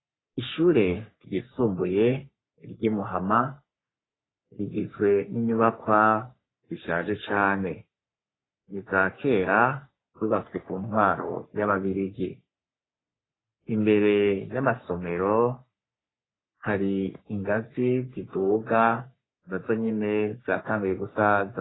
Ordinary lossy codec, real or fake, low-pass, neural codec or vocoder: AAC, 16 kbps; fake; 7.2 kHz; codec, 44.1 kHz, 3.4 kbps, Pupu-Codec